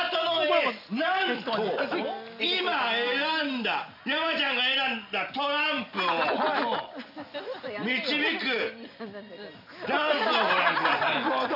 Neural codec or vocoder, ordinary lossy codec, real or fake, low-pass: none; none; real; 5.4 kHz